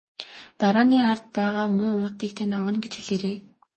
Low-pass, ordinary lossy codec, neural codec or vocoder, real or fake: 10.8 kHz; MP3, 32 kbps; codec, 44.1 kHz, 2.6 kbps, DAC; fake